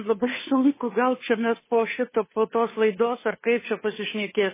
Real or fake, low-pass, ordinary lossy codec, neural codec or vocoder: fake; 3.6 kHz; MP3, 16 kbps; codec, 16 kHz, 2 kbps, FunCodec, trained on Chinese and English, 25 frames a second